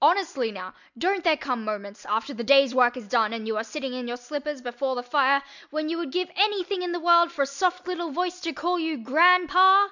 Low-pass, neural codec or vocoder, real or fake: 7.2 kHz; none; real